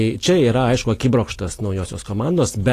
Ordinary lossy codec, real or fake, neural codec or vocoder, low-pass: AAC, 48 kbps; real; none; 14.4 kHz